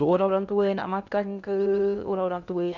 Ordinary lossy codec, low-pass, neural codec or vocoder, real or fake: none; 7.2 kHz; codec, 16 kHz in and 24 kHz out, 0.8 kbps, FocalCodec, streaming, 65536 codes; fake